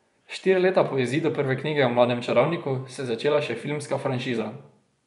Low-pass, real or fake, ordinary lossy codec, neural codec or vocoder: 10.8 kHz; fake; none; vocoder, 24 kHz, 100 mel bands, Vocos